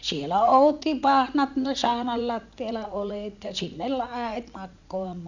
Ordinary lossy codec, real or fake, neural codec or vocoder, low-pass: none; fake; vocoder, 44.1 kHz, 80 mel bands, Vocos; 7.2 kHz